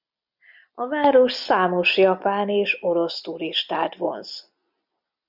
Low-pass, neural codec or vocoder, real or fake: 5.4 kHz; none; real